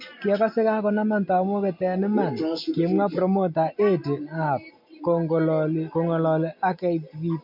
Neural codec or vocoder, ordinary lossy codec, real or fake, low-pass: none; MP3, 48 kbps; real; 5.4 kHz